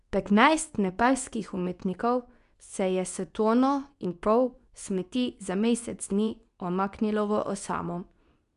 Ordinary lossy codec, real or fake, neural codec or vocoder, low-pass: none; fake; codec, 24 kHz, 0.9 kbps, WavTokenizer, medium speech release version 2; 10.8 kHz